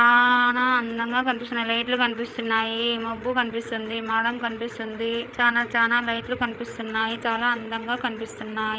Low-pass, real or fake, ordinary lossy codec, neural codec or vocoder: none; fake; none; codec, 16 kHz, 8 kbps, FreqCodec, larger model